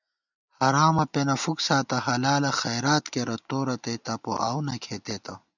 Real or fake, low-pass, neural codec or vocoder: real; 7.2 kHz; none